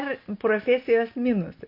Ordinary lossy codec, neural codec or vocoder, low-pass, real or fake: MP3, 32 kbps; none; 5.4 kHz; real